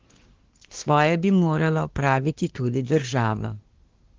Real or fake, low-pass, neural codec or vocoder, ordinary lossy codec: fake; 7.2 kHz; codec, 24 kHz, 1 kbps, SNAC; Opus, 16 kbps